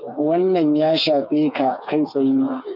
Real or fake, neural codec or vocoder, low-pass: fake; codec, 16 kHz, 4 kbps, FreqCodec, smaller model; 5.4 kHz